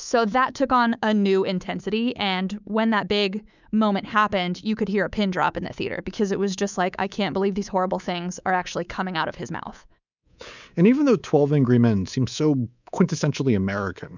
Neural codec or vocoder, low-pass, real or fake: codec, 24 kHz, 3.1 kbps, DualCodec; 7.2 kHz; fake